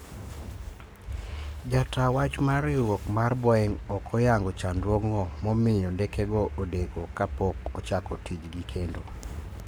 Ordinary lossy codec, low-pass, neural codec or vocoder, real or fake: none; none; codec, 44.1 kHz, 7.8 kbps, Pupu-Codec; fake